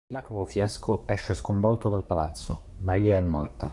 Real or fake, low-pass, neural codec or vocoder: fake; 10.8 kHz; codec, 24 kHz, 1 kbps, SNAC